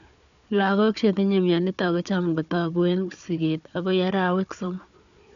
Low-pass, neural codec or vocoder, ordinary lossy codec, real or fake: 7.2 kHz; codec, 16 kHz, 4 kbps, FunCodec, trained on Chinese and English, 50 frames a second; none; fake